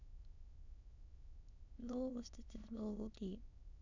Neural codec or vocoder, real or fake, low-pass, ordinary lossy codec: autoencoder, 22.05 kHz, a latent of 192 numbers a frame, VITS, trained on many speakers; fake; 7.2 kHz; none